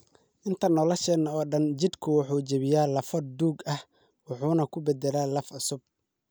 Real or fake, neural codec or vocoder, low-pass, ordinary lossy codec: real; none; none; none